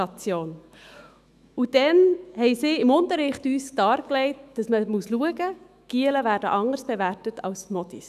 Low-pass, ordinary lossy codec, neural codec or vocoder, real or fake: 14.4 kHz; none; autoencoder, 48 kHz, 128 numbers a frame, DAC-VAE, trained on Japanese speech; fake